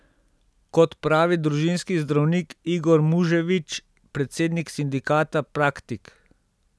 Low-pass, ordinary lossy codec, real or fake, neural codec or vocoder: none; none; real; none